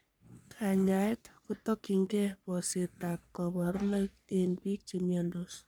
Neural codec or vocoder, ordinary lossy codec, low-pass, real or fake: codec, 44.1 kHz, 3.4 kbps, Pupu-Codec; none; none; fake